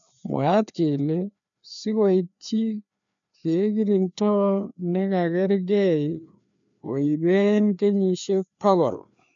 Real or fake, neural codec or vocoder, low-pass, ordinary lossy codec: fake; codec, 16 kHz, 2 kbps, FreqCodec, larger model; 7.2 kHz; none